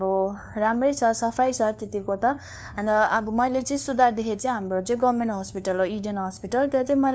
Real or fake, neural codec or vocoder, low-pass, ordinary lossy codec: fake; codec, 16 kHz, 2 kbps, FunCodec, trained on LibriTTS, 25 frames a second; none; none